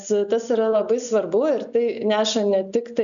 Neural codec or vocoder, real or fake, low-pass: none; real; 7.2 kHz